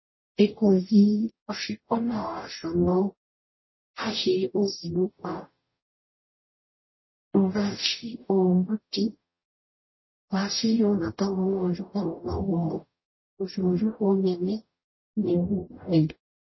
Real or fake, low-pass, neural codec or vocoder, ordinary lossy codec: fake; 7.2 kHz; codec, 44.1 kHz, 0.9 kbps, DAC; MP3, 24 kbps